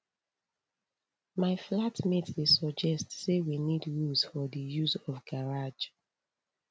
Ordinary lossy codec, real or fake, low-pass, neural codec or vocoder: none; real; none; none